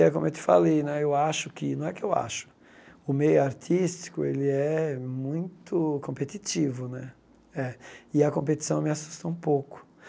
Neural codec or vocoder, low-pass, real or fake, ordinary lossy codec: none; none; real; none